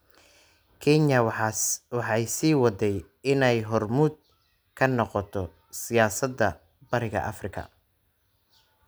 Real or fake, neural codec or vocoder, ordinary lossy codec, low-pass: real; none; none; none